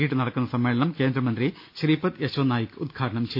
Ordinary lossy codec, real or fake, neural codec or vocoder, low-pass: MP3, 32 kbps; real; none; 5.4 kHz